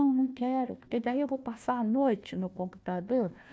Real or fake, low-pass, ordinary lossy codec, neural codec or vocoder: fake; none; none; codec, 16 kHz, 1 kbps, FunCodec, trained on Chinese and English, 50 frames a second